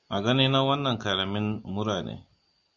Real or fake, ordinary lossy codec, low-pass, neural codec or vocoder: real; MP3, 48 kbps; 7.2 kHz; none